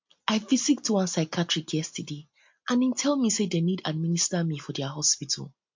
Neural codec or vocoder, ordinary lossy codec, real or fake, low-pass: none; MP3, 48 kbps; real; 7.2 kHz